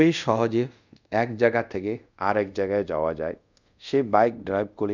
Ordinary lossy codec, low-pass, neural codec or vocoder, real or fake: none; 7.2 kHz; codec, 24 kHz, 0.5 kbps, DualCodec; fake